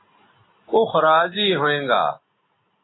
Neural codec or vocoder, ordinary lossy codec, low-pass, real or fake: none; AAC, 16 kbps; 7.2 kHz; real